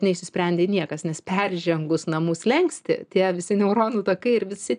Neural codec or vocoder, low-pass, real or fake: none; 9.9 kHz; real